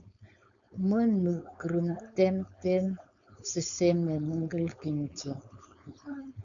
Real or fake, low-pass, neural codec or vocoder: fake; 7.2 kHz; codec, 16 kHz, 4.8 kbps, FACodec